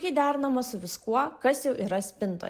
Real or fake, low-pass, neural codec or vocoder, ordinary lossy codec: real; 14.4 kHz; none; Opus, 24 kbps